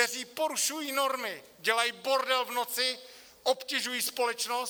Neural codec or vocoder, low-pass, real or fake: none; 19.8 kHz; real